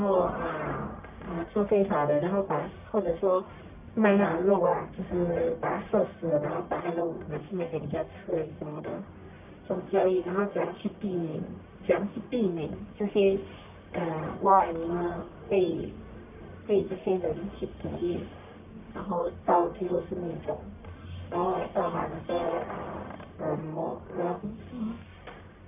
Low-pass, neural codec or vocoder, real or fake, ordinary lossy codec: 3.6 kHz; codec, 44.1 kHz, 1.7 kbps, Pupu-Codec; fake; none